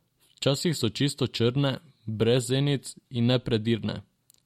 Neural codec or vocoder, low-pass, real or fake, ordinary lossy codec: none; 19.8 kHz; real; MP3, 64 kbps